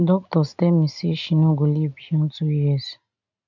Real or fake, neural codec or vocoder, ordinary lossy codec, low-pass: real; none; none; 7.2 kHz